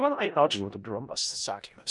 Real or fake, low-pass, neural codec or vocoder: fake; 10.8 kHz; codec, 16 kHz in and 24 kHz out, 0.4 kbps, LongCat-Audio-Codec, four codebook decoder